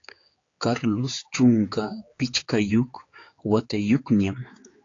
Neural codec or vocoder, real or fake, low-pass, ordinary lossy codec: codec, 16 kHz, 4 kbps, X-Codec, HuBERT features, trained on general audio; fake; 7.2 kHz; AAC, 32 kbps